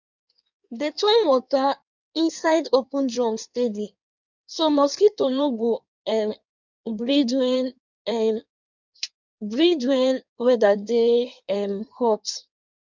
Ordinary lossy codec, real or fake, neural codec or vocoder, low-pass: none; fake; codec, 16 kHz in and 24 kHz out, 1.1 kbps, FireRedTTS-2 codec; 7.2 kHz